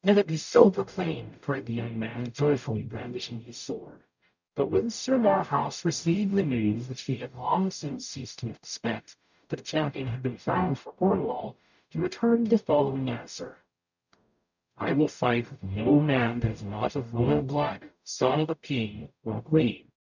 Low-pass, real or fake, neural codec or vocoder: 7.2 kHz; fake; codec, 44.1 kHz, 0.9 kbps, DAC